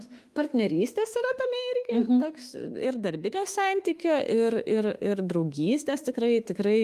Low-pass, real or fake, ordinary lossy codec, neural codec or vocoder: 14.4 kHz; fake; Opus, 32 kbps; autoencoder, 48 kHz, 32 numbers a frame, DAC-VAE, trained on Japanese speech